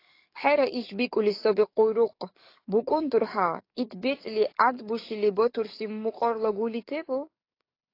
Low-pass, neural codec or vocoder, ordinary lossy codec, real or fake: 5.4 kHz; codec, 44.1 kHz, 7.8 kbps, DAC; AAC, 32 kbps; fake